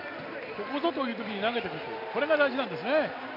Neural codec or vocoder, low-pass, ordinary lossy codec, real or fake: none; 5.4 kHz; none; real